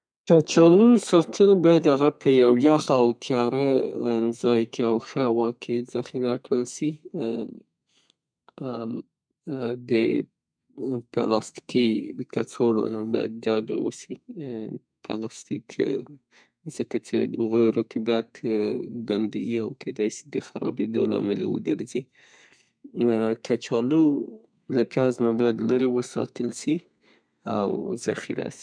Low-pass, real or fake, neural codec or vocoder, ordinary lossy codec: 9.9 kHz; fake; codec, 32 kHz, 1.9 kbps, SNAC; MP3, 96 kbps